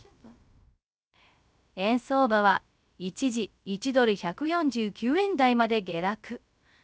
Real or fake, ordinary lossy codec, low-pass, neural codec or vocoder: fake; none; none; codec, 16 kHz, 0.3 kbps, FocalCodec